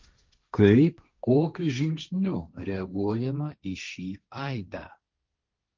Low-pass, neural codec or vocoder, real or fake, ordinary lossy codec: 7.2 kHz; codec, 16 kHz, 1.1 kbps, Voila-Tokenizer; fake; Opus, 24 kbps